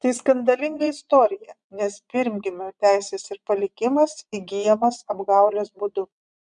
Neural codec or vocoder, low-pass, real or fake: vocoder, 22.05 kHz, 80 mel bands, Vocos; 9.9 kHz; fake